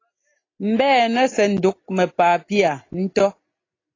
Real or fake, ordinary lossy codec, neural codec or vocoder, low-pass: real; AAC, 32 kbps; none; 7.2 kHz